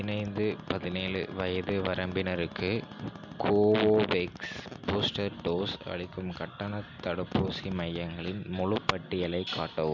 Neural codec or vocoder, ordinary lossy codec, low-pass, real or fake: none; MP3, 64 kbps; 7.2 kHz; real